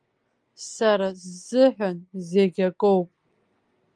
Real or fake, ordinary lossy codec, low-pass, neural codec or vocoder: real; Opus, 32 kbps; 9.9 kHz; none